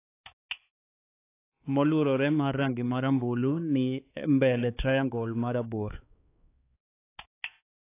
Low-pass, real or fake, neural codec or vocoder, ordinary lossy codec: 3.6 kHz; fake; codec, 16 kHz, 4 kbps, X-Codec, HuBERT features, trained on balanced general audio; AAC, 24 kbps